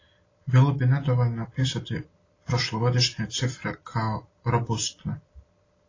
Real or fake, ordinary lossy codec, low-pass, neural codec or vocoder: real; AAC, 32 kbps; 7.2 kHz; none